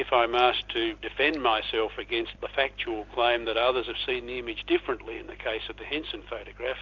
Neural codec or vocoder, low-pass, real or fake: none; 7.2 kHz; real